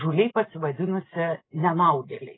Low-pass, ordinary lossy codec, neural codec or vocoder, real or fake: 7.2 kHz; AAC, 16 kbps; none; real